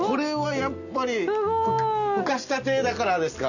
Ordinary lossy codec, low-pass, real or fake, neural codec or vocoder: none; 7.2 kHz; real; none